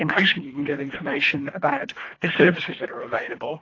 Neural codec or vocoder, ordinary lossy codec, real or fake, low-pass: codec, 24 kHz, 1.5 kbps, HILCodec; AAC, 32 kbps; fake; 7.2 kHz